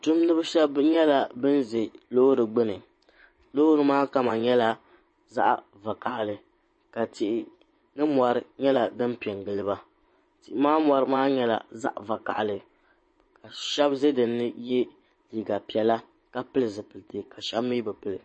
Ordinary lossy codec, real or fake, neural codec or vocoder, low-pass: MP3, 32 kbps; fake; vocoder, 24 kHz, 100 mel bands, Vocos; 9.9 kHz